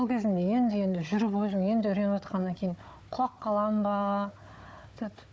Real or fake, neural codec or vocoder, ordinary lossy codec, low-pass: fake; codec, 16 kHz, 4 kbps, FunCodec, trained on Chinese and English, 50 frames a second; none; none